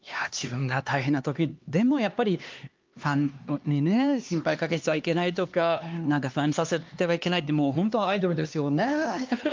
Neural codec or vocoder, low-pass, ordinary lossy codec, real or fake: codec, 16 kHz, 2 kbps, X-Codec, HuBERT features, trained on LibriSpeech; 7.2 kHz; Opus, 24 kbps; fake